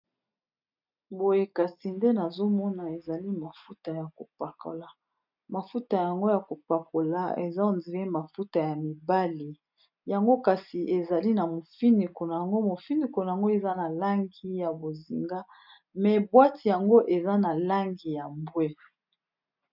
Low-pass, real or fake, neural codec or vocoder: 5.4 kHz; real; none